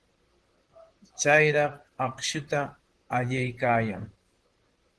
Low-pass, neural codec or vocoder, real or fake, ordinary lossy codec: 10.8 kHz; vocoder, 44.1 kHz, 128 mel bands, Pupu-Vocoder; fake; Opus, 16 kbps